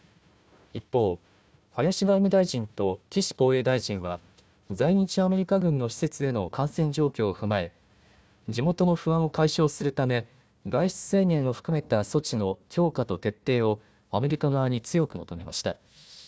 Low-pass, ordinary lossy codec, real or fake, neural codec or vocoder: none; none; fake; codec, 16 kHz, 1 kbps, FunCodec, trained on Chinese and English, 50 frames a second